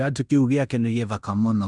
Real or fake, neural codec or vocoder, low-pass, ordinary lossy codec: fake; codec, 16 kHz in and 24 kHz out, 0.9 kbps, LongCat-Audio-Codec, fine tuned four codebook decoder; 10.8 kHz; none